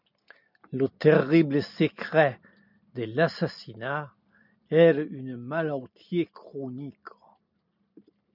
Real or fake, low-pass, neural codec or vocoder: real; 5.4 kHz; none